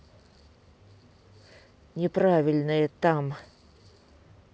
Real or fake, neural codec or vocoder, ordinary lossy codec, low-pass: real; none; none; none